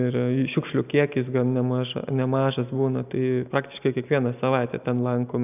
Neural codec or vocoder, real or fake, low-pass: none; real; 3.6 kHz